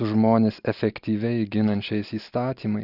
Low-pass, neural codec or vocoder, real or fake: 5.4 kHz; none; real